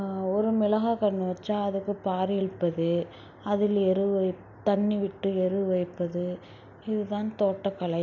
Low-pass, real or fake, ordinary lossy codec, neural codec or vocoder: 7.2 kHz; real; none; none